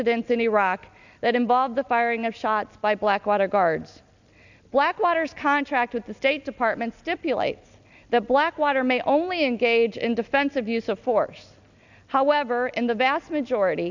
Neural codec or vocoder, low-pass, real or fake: none; 7.2 kHz; real